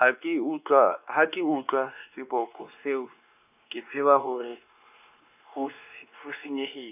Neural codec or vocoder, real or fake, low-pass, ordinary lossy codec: codec, 16 kHz, 2 kbps, X-Codec, WavLM features, trained on Multilingual LibriSpeech; fake; 3.6 kHz; none